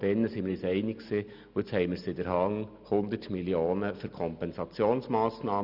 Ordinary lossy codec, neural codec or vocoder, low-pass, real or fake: none; none; 5.4 kHz; real